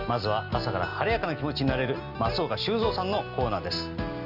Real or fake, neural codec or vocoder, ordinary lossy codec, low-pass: real; none; Opus, 32 kbps; 5.4 kHz